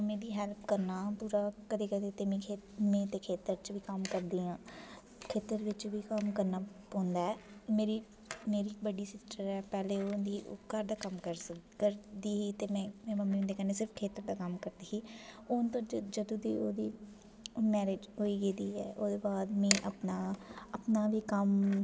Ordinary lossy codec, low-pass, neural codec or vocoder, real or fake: none; none; none; real